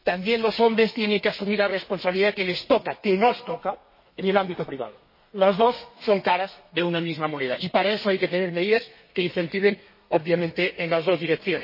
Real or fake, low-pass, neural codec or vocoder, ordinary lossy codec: fake; 5.4 kHz; codec, 32 kHz, 1.9 kbps, SNAC; MP3, 24 kbps